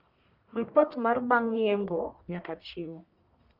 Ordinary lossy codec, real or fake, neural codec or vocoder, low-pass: none; fake; codec, 44.1 kHz, 1.7 kbps, Pupu-Codec; 5.4 kHz